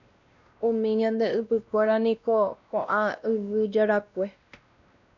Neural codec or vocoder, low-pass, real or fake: codec, 16 kHz, 1 kbps, X-Codec, WavLM features, trained on Multilingual LibriSpeech; 7.2 kHz; fake